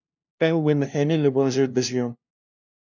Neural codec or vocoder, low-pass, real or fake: codec, 16 kHz, 0.5 kbps, FunCodec, trained on LibriTTS, 25 frames a second; 7.2 kHz; fake